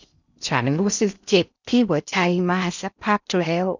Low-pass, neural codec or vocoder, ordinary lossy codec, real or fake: 7.2 kHz; codec, 16 kHz in and 24 kHz out, 0.6 kbps, FocalCodec, streaming, 4096 codes; none; fake